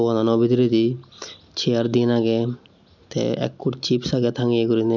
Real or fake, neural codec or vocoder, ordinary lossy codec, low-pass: real; none; none; 7.2 kHz